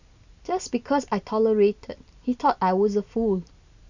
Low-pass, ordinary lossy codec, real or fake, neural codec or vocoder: 7.2 kHz; none; real; none